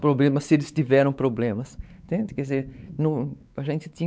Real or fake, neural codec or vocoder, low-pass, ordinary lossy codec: fake; codec, 16 kHz, 4 kbps, X-Codec, WavLM features, trained on Multilingual LibriSpeech; none; none